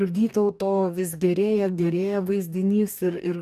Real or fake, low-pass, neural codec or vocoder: fake; 14.4 kHz; codec, 44.1 kHz, 2.6 kbps, DAC